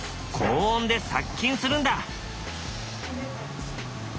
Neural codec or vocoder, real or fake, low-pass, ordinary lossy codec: none; real; none; none